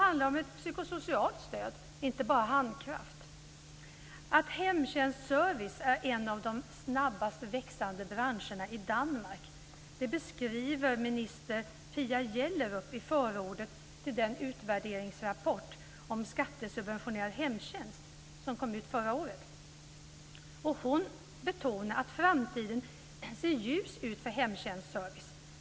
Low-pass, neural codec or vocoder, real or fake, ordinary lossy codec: none; none; real; none